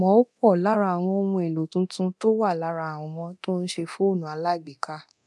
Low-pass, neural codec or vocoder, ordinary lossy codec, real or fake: none; codec, 24 kHz, 0.9 kbps, DualCodec; none; fake